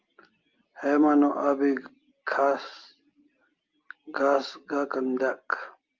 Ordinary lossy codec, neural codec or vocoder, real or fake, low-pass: Opus, 32 kbps; none; real; 7.2 kHz